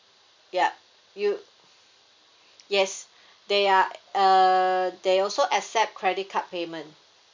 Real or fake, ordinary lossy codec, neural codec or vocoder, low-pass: real; MP3, 64 kbps; none; 7.2 kHz